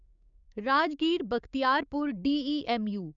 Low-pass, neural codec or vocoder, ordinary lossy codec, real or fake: 7.2 kHz; codec, 16 kHz, 6 kbps, DAC; none; fake